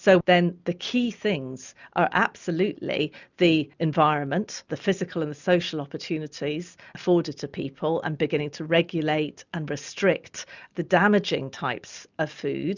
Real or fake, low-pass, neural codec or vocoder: real; 7.2 kHz; none